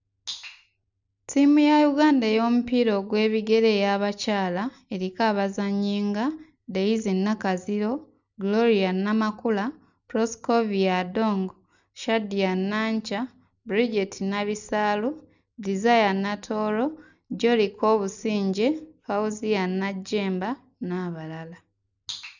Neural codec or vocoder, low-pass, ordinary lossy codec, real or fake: none; 7.2 kHz; none; real